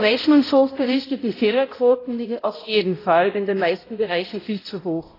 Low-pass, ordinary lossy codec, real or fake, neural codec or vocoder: 5.4 kHz; AAC, 24 kbps; fake; codec, 16 kHz, 0.5 kbps, X-Codec, HuBERT features, trained on balanced general audio